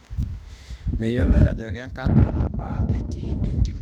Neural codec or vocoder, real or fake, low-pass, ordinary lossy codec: autoencoder, 48 kHz, 32 numbers a frame, DAC-VAE, trained on Japanese speech; fake; 19.8 kHz; none